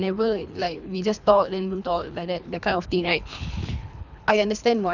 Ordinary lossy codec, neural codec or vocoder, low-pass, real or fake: none; codec, 24 kHz, 3 kbps, HILCodec; 7.2 kHz; fake